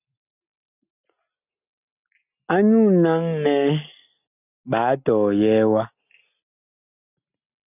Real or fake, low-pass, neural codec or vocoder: real; 3.6 kHz; none